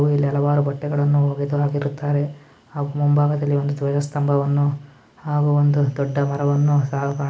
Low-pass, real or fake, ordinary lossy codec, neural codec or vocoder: none; real; none; none